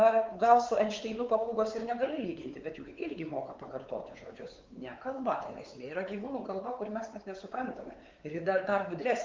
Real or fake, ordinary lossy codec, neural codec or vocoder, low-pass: fake; Opus, 32 kbps; codec, 16 kHz, 4 kbps, X-Codec, WavLM features, trained on Multilingual LibriSpeech; 7.2 kHz